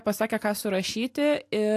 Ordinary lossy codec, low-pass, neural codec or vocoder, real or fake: AAC, 64 kbps; 14.4 kHz; none; real